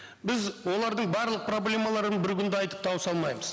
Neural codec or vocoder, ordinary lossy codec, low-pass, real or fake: none; none; none; real